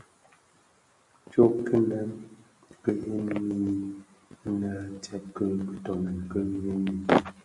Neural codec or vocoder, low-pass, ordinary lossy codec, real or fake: none; 10.8 kHz; AAC, 64 kbps; real